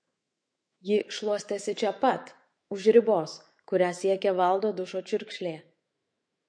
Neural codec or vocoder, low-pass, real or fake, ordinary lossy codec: autoencoder, 48 kHz, 128 numbers a frame, DAC-VAE, trained on Japanese speech; 9.9 kHz; fake; MP3, 48 kbps